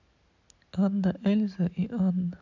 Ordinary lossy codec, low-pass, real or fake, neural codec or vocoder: none; 7.2 kHz; real; none